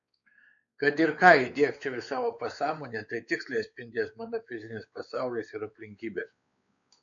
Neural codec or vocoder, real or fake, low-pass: codec, 16 kHz, 4 kbps, X-Codec, WavLM features, trained on Multilingual LibriSpeech; fake; 7.2 kHz